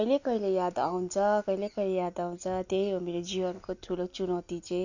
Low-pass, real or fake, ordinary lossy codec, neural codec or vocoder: 7.2 kHz; real; none; none